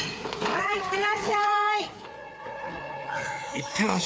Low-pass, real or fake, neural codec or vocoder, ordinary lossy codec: none; fake; codec, 16 kHz, 4 kbps, FreqCodec, larger model; none